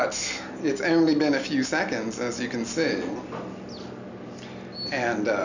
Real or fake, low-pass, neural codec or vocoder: real; 7.2 kHz; none